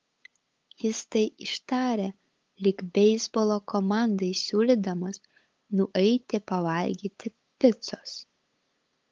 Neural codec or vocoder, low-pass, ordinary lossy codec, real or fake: codec, 16 kHz, 8 kbps, FunCodec, trained on LibriTTS, 25 frames a second; 7.2 kHz; Opus, 32 kbps; fake